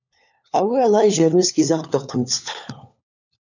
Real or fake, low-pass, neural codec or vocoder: fake; 7.2 kHz; codec, 16 kHz, 4 kbps, FunCodec, trained on LibriTTS, 50 frames a second